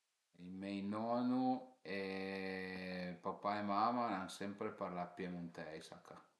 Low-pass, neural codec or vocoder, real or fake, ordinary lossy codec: none; none; real; none